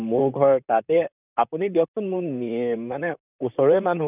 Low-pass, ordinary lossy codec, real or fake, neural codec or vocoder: 3.6 kHz; none; fake; vocoder, 44.1 kHz, 128 mel bands, Pupu-Vocoder